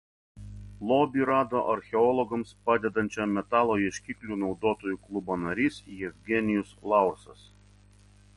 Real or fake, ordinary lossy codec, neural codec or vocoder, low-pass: real; MP3, 48 kbps; none; 19.8 kHz